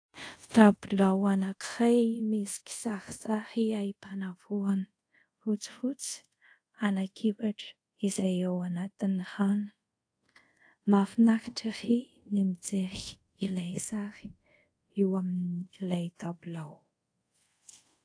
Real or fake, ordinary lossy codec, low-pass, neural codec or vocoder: fake; AAC, 48 kbps; 9.9 kHz; codec, 24 kHz, 0.5 kbps, DualCodec